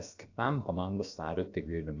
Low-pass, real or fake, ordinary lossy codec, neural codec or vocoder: 7.2 kHz; fake; AAC, 48 kbps; codec, 16 kHz, about 1 kbps, DyCAST, with the encoder's durations